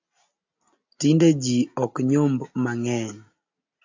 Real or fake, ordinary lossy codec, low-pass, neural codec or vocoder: real; AAC, 48 kbps; 7.2 kHz; none